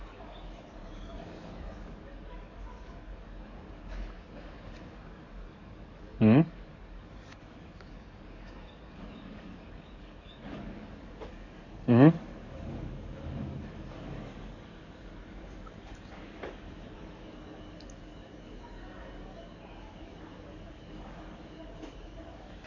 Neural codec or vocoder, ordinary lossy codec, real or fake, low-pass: codec, 44.1 kHz, 7.8 kbps, DAC; none; fake; 7.2 kHz